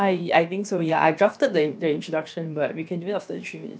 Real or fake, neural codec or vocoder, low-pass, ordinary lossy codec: fake; codec, 16 kHz, about 1 kbps, DyCAST, with the encoder's durations; none; none